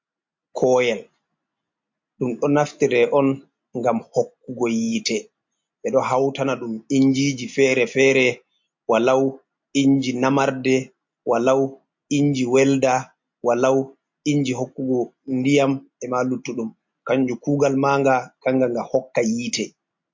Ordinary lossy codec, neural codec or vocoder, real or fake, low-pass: MP3, 48 kbps; none; real; 7.2 kHz